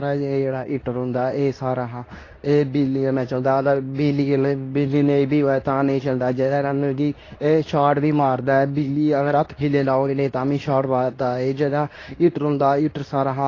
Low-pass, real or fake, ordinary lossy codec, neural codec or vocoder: 7.2 kHz; fake; AAC, 32 kbps; codec, 24 kHz, 0.9 kbps, WavTokenizer, medium speech release version 2